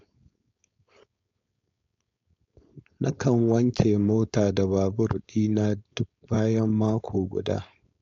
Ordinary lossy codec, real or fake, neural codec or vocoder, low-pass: AAC, 48 kbps; fake; codec, 16 kHz, 4.8 kbps, FACodec; 7.2 kHz